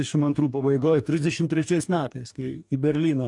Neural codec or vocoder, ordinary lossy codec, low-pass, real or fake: codec, 44.1 kHz, 2.6 kbps, DAC; AAC, 64 kbps; 10.8 kHz; fake